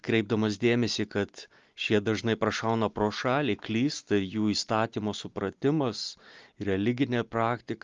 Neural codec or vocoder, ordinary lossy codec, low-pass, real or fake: none; Opus, 32 kbps; 7.2 kHz; real